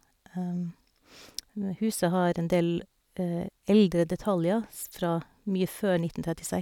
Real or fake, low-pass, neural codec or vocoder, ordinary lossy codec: real; 19.8 kHz; none; none